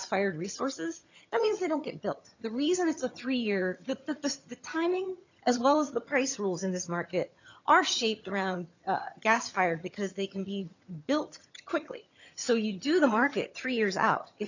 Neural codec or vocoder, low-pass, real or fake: vocoder, 22.05 kHz, 80 mel bands, HiFi-GAN; 7.2 kHz; fake